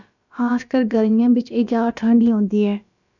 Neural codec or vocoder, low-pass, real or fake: codec, 16 kHz, about 1 kbps, DyCAST, with the encoder's durations; 7.2 kHz; fake